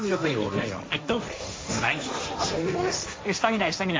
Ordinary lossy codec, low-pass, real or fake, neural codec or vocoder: none; none; fake; codec, 16 kHz, 1.1 kbps, Voila-Tokenizer